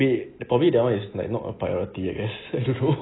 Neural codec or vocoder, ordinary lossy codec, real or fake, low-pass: none; AAC, 16 kbps; real; 7.2 kHz